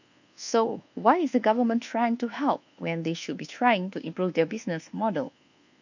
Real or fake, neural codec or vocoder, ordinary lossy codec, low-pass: fake; codec, 24 kHz, 1.2 kbps, DualCodec; none; 7.2 kHz